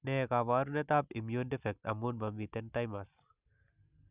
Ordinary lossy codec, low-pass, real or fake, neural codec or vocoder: none; 3.6 kHz; real; none